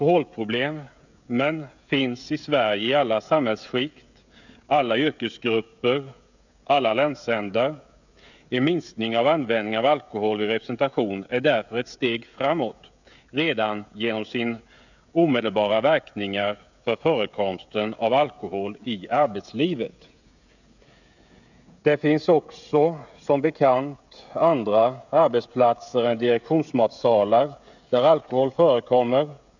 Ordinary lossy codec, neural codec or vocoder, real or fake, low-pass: none; codec, 16 kHz, 16 kbps, FreqCodec, smaller model; fake; 7.2 kHz